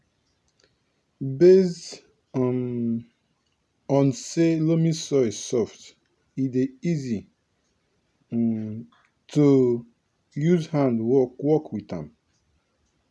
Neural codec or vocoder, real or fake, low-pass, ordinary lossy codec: none; real; none; none